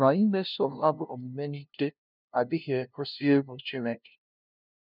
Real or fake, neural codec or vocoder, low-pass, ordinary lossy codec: fake; codec, 16 kHz, 0.5 kbps, FunCodec, trained on LibriTTS, 25 frames a second; 5.4 kHz; none